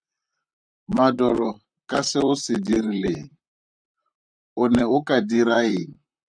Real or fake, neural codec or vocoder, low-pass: fake; vocoder, 44.1 kHz, 128 mel bands, Pupu-Vocoder; 9.9 kHz